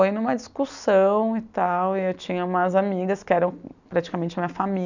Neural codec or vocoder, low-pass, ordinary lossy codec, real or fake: none; 7.2 kHz; none; real